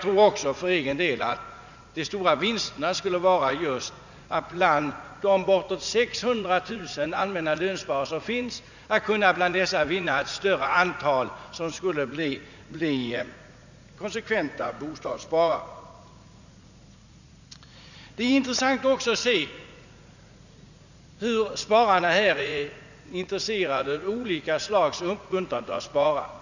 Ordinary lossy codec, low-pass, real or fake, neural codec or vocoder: none; 7.2 kHz; fake; vocoder, 44.1 kHz, 80 mel bands, Vocos